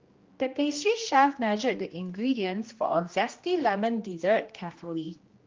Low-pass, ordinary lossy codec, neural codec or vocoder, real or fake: 7.2 kHz; Opus, 32 kbps; codec, 16 kHz, 1 kbps, X-Codec, HuBERT features, trained on general audio; fake